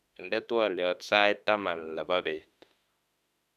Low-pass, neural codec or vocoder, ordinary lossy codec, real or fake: 14.4 kHz; autoencoder, 48 kHz, 32 numbers a frame, DAC-VAE, trained on Japanese speech; none; fake